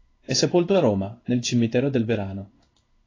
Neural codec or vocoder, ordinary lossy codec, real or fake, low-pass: codec, 16 kHz in and 24 kHz out, 1 kbps, XY-Tokenizer; AAC, 32 kbps; fake; 7.2 kHz